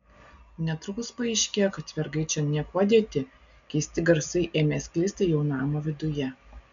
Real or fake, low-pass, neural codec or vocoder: real; 7.2 kHz; none